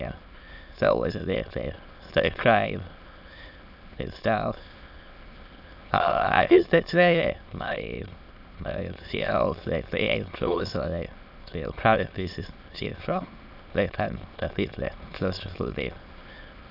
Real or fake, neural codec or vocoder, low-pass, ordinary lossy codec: fake; autoencoder, 22.05 kHz, a latent of 192 numbers a frame, VITS, trained on many speakers; 5.4 kHz; AAC, 48 kbps